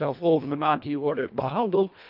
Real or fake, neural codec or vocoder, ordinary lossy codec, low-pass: fake; codec, 24 kHz, 1.5 kbps, HILCodec; none; 5.4 kHz